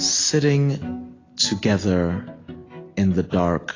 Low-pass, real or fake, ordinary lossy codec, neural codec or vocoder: 7.2 kHz; real; AAC, 32 kbps; none